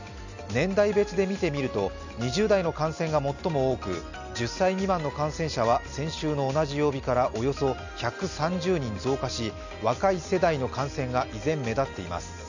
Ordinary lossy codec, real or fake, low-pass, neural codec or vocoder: none; real; 7.2 kHz; none